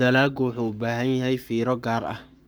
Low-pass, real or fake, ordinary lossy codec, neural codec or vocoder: none; fake; none; codec, 44.1 kHz, 7.8 kbps, Pupu-Codec